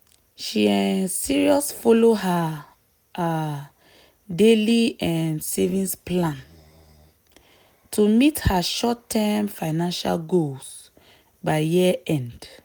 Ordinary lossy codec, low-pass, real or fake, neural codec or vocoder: none; none; real; none